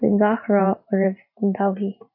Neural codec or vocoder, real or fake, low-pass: vocoder, 44.1 kHz, 128 mel bands every 512 samples, BigVGAN v2; fake; 5.4 kHz